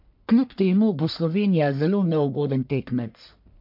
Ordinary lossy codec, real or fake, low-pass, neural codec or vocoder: MP3, 48 kbps; fake; 5.4 kHz; codec, 44.1 kHz, 1.7 kbps, Pupu-Codec